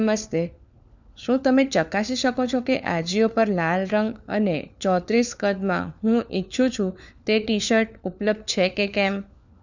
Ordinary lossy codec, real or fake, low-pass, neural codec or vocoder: none; fake; 7.2 kHz; codec, 16 kHz, 4 kbps, FunCodec, trained on LibriTTS, 50 frames a second